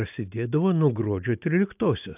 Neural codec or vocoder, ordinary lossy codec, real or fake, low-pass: none; AAC, 32 kbps; real; 3.6 kHz